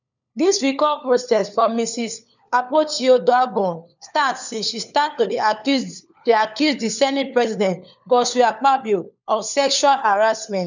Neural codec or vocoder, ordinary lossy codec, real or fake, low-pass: codec, 16 kHz, 4 kbps, FunCodec, trained on LibriTTS, 50 frames a second; none; fake; 7.2 kHz